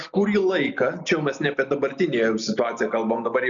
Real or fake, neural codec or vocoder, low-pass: real; none; 7.2 kHz